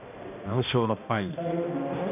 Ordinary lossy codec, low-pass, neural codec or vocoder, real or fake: none; 3.6 kHz; codec, 16 kHz, 1 kbps, X-Codec, HuBERT features, trained on balanced general audio; fake